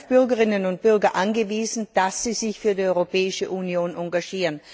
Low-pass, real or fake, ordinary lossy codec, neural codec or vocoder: none; real; none; none